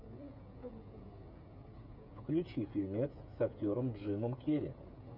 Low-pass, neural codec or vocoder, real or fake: 5.4 kHz; codec, 16 kHz, 16 kbps, FreqCodec, smaller model; fake